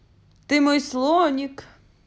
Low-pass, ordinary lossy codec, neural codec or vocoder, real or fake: none; none; none; real